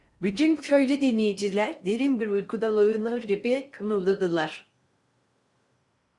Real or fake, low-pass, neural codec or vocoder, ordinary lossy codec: fake; 10.8 kHz; codec, 16 kHz in and 24 kHz out, 0.6 kbps, FocalCodec, streaming, 4096 codes; Opus, 64 kbps